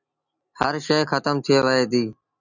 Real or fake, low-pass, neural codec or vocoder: real; 7.2 kHz; none